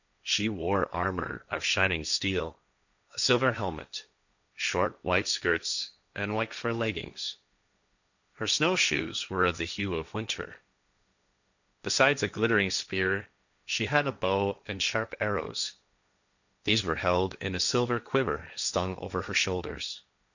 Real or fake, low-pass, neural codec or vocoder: fake; 7.2 kHz; codec, 16 kHz, 1.1 kbps, Voila-Tokenizer